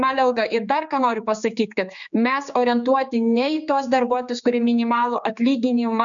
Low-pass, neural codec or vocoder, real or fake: 7.2 kHz; codec, 16 kHz, 4 kbps, X-Codec, HuBERT features, trained on general audio; fake